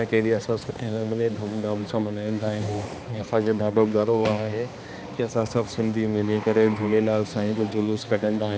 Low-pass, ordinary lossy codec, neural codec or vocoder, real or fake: none; none; codec, 16 kHz, 2 kbps, X-Codec, HuBERT features, trained on balanced general audio; fake